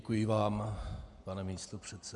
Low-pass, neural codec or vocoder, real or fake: 10.8 kHz; vocoder, 48 kHz, 128 mel bands, Vocos; fake